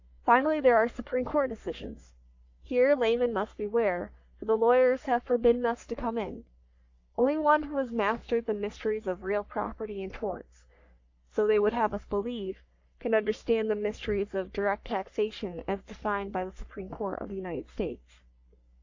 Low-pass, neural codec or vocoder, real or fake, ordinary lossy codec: 7.2 kHz; codec, 44.1 kHz, 3.4 kbps, Pupu-Codec; fake; AAC, 48 kbps